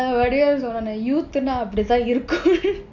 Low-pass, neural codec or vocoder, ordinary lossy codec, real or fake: 7.2 kHz; none; MP3, 64 kbps; real